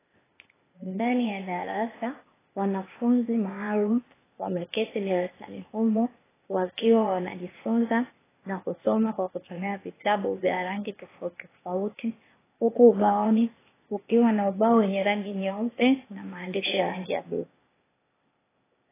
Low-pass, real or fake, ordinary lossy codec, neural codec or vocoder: 3.6 kHz; fake; AAC, 16 kbps; codec, 16 kHz, 0.8 kbps, ZipCodec